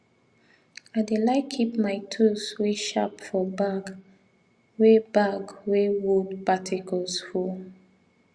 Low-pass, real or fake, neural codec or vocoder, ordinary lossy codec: 9.9 kHz; real; none; Opus, 64 kbps